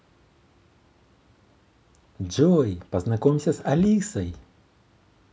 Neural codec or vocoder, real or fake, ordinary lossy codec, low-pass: none; real; none; none